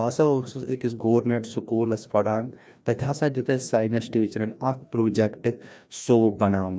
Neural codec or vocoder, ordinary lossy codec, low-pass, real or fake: codec, 16 kHz, 1 kbps, FreqCodec, larger model; none; none; fake